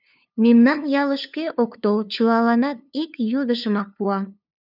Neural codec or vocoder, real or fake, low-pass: codec, 16 kHz, 2 kbps, FunCodec, trained on LibriTTS, 25 frames a second; fake; 5.4 kHz